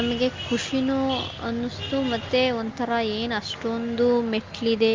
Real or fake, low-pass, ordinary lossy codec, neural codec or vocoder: real; 7.2 kHz; Opus, 32 kbps; none